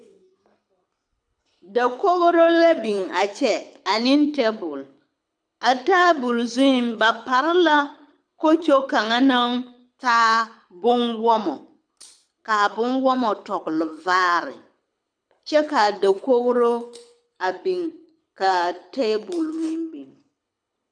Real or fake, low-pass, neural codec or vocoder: fake; 9.9 kHz; codec, 24 kHz, 6 kbps, HILCodec